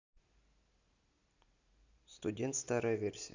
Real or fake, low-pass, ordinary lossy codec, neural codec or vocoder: real; 7.2 kHz; Opus, 64 kbps; none